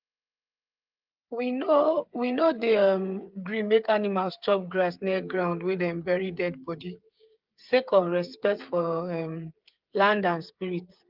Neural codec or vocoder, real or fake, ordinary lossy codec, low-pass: codec, 16 kHz, 8 kbps, FreqCodec, smaller model; fake; Opus, 24 kbps; 5.4 kHz